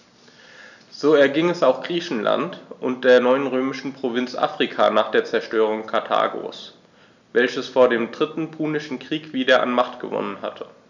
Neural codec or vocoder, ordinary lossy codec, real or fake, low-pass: none; none; real; 7.2 kHz